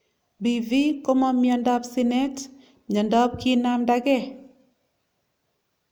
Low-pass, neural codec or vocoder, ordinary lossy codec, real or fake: none; none; none; real